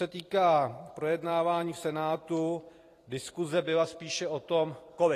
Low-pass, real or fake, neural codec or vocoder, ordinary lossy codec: 14.4 kHz; fake; vocoder, 44.1 kHz, 128 mel bands every 256 samples, BigVGAN v2; AAC, 48 kbps